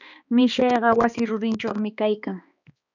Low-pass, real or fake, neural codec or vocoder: 7.2 kHz; fake; codec, 16 kHz, 2 kbps, X-Codec, HuBERT features, trained on balanced general audio